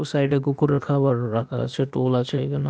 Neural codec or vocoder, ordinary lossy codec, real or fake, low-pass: codec, 16 kHz, 0.8 kbps, ZipCodec; none; fake; none